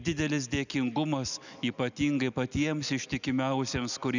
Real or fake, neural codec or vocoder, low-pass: real; none; 7.2 kHz